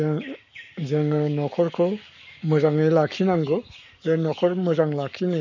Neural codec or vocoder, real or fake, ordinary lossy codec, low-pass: codec, 44.1 kHz, 7.8 kbps, Pupu-Codec; fake; none; 7.2 kHz